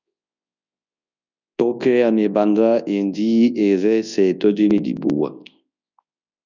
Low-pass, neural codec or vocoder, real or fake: 7.2 kHz; codec, 24 kHz, 0.9 kbps, WavTokenizer, large speech release; fake